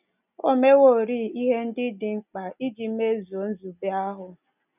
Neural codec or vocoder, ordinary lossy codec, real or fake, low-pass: none; none; real; 3.6 kHz